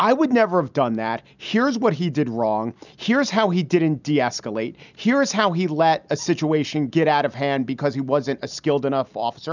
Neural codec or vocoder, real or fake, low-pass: none; real; 7.2 kHz